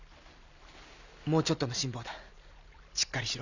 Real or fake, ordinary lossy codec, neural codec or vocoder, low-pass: real; none; none; 7.2 kHz